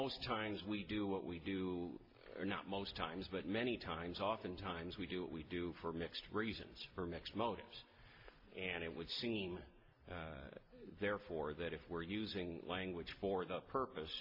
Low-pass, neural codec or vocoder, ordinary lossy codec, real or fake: 5.4 kHz; none; MP3, 32 kbps; real